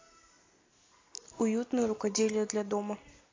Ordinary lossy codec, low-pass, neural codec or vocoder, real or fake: AAC, 32 kbps; 7.2 kHz; none; real